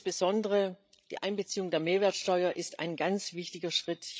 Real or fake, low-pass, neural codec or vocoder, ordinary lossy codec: fake; none; codec, 16 kHz, 16 kbps, FreqCodec, larger model; none